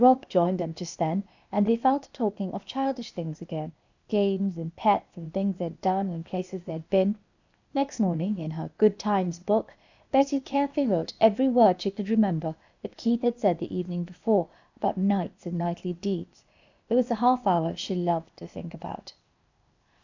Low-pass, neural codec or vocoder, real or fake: 7.2 kHz; codec, 16 kHz, 0.8 kbps, ZipCodec; fake